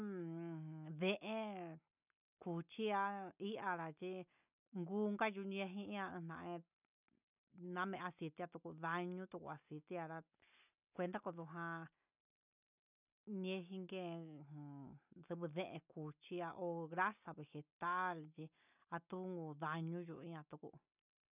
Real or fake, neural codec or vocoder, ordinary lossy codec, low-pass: real; none; none; 3.6 kHz